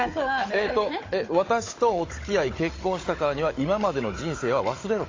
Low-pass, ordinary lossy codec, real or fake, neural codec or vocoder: 7.2 kHz; AAC, 32 kbps; fake; codec, 16 kHz, 16 kbps, FunCodec, trained on Chinese and English, 50 frames a second